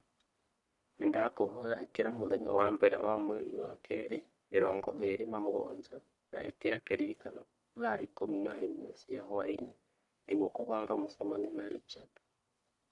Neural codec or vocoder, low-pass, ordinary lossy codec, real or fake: codec, 44.1 kHz, 1.7 kbps, Pupu-Codec; 10.8 kHz; none; fake